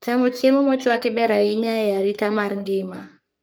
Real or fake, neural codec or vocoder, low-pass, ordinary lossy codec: fake; codec, 44.1 kHz, 3.4 kbps, Pupu-Codec; none; none